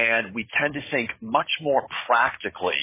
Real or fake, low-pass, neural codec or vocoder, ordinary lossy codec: fake; 3.6 kHz; codec, 16 kHz, 16 kbps, FunCodec, trained on Chinese and English, 50 frames a second; MP3, 16 kbps